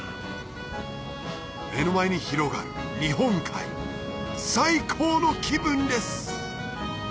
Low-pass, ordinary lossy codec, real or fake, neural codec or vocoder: none; none; real; none